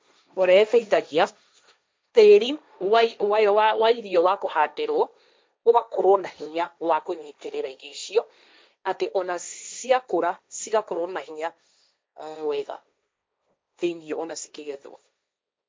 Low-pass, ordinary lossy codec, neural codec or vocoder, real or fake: none; none; codec, 16 kHz, 1.1 kbps, Voila-Tokenizer; fake